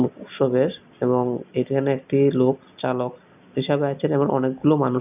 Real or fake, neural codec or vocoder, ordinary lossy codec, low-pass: real; none; none; 3.6 kHz